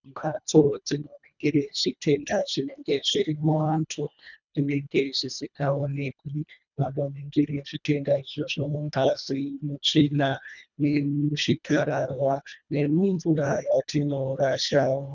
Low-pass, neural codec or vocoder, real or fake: 7.2 kHz; codec, 24 kHz, 1.5 kbps, HILCodec; fake